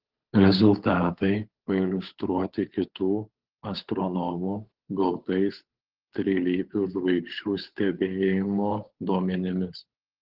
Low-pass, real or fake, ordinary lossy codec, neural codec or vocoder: 5.4 kHz; fake; Opus, 16 kbps; codec, 16 kHz, 8 kbps, FunCodec, trained on Chinese and English, 25 frames a second